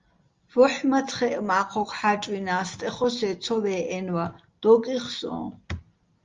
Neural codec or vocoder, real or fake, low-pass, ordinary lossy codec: none; real; 7.2 kHz; Opus, 32 kbps